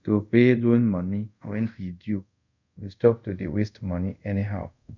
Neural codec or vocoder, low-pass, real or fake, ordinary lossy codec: codec, 24 kHz, 0.5 kbps, DualCodec; 7.2 kHz; fake; none